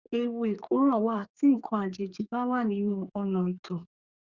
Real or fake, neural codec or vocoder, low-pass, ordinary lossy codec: fake; codec, 32 kHz, 1.9 kbps, SNAC; 7.2 kHz; Opus, 64 kbps